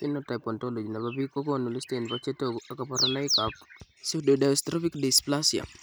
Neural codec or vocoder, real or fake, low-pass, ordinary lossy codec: none; real; none; none